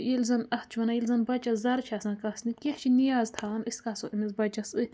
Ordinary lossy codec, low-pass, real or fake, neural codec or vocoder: none; none; real; none